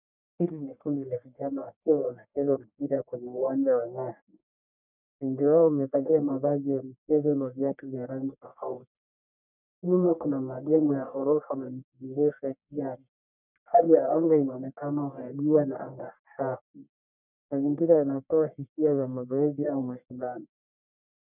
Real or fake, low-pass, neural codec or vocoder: fake; 3.6 kHz; codec, 44.1 kHz, 1.7 kbps, Pupu-Codec